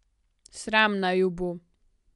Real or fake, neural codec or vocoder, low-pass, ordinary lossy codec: real; none; 10.8 kHz; none